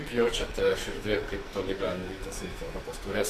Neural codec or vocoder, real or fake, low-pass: codec, 44.1 kHz, 2.6 kbps, SNAC; fake; 14.4 kHz